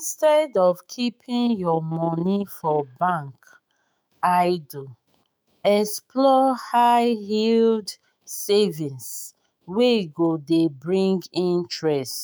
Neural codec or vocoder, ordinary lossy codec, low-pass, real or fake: autoencoder, 48 kHz, 128 numbers a frame, DAC-VAE, trained on Japanese speech; none; none; fake